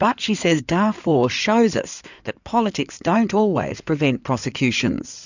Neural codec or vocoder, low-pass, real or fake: codec, 16 kHz in and 24 kHz out, 2.2 kbps, FireRedTTS-2 codec; 7.2 kHz; fake